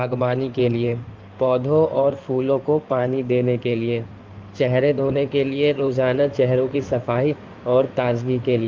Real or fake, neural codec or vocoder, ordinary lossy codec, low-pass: fake; codec, 16 kHz in and 24 kHz out, 2.2 kbps, FireRedTTS-2 codec; Opus, 24 kbps; 7.2 kHz